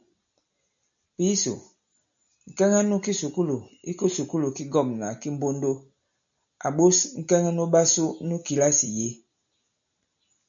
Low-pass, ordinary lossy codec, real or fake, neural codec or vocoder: 7.2 kHz; MP3, 96 kbps; real; none